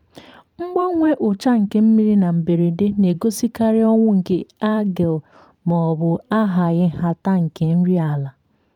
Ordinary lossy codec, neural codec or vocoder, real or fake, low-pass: none; vocoder, 44.1 kHz, 128 mel bands every 512 samples, BigVGAN v2; fake; 19.8 kHz